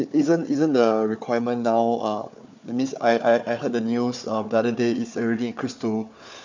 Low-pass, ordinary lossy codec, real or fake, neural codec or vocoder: 7.2 kHz; MP3, 64 kbps; fake; codec, 16 kHz, 4 kbps, FunCodec, trained on Chinese and English, 50 frames a second